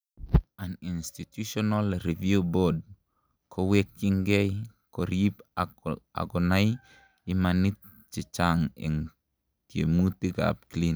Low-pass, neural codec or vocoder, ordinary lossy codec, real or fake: none; none; none; real